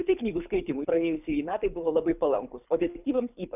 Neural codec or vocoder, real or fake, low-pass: codec, 24 kHz, 6 kbps, HILCodec; fake; 3.6 kHz